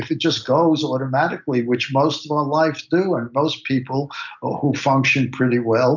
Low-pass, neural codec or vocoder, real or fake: 7.2 kHz; none; real